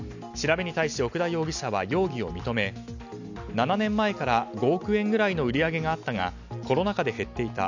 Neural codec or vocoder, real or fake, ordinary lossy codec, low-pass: none; real; none; 7.2 kHz